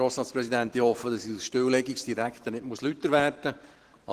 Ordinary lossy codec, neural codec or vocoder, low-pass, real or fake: Opus, 16 kbps; vocoder, 44.1 kHz, 128 mel bands every 512 samples, BigVGAN v2; 14.4 kHz; fake